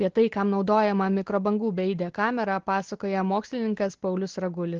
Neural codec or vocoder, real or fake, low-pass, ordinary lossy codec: none; real; 7.2 kHz; Opus, 16 kbps